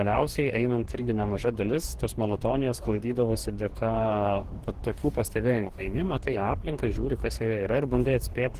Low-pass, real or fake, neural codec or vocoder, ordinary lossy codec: 14.4 kHz; fake; codec, 44.1 kHz, 2.6 kbps, DAC; Opus, 16 kbps